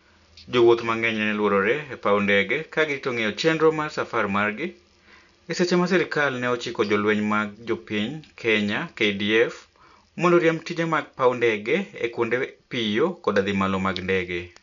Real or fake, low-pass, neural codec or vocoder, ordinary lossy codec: real; 7.2 kHz; none; none